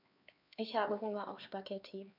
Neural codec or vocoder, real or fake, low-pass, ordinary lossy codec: codec, 16 kHz, 4 kbps, X-Codec, HuBERT features, trained on LibriSpeech; fake; 5.4 kHz; none